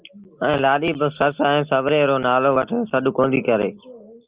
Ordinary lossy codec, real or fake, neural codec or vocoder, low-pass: Opus, 24 kbps; real; none; 3.6 kHz